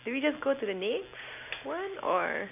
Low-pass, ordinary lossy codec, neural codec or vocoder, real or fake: 3.6 kHz; none; none; real